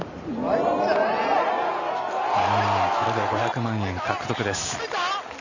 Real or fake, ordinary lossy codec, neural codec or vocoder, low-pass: real; none; none; 7.2 kHz